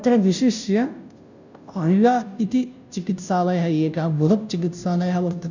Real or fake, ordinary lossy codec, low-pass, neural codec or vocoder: fake; none; 7.2 kHz; codec, 16 kHz, 0.5 kbps, FunCodec, trained on Chinese and English, 25 frames a second